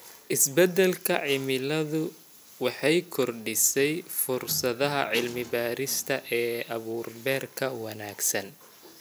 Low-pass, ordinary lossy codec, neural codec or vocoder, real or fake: none; none; none; real